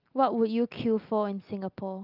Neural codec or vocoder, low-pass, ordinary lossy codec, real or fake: none; 5.4 kHz; Opus, 24 kbps; real